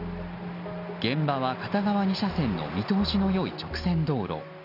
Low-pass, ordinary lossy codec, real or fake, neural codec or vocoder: 5.4 kHz; none; real; none